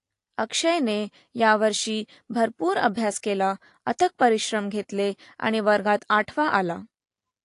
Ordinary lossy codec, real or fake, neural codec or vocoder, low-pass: AAC, 48 kbps; real; none; 10.8 kHz